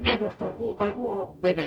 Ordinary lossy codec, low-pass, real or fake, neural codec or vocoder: none; 19.8 kHz; fake; codec, 44.1 kHz, 0.9 kbps, DAC